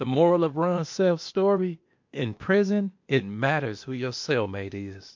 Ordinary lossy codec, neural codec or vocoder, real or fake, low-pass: MP3, 48 kbps; codec, 16 kHz, 0.8 kbps, ZipCodec; fake; 7.2 kHz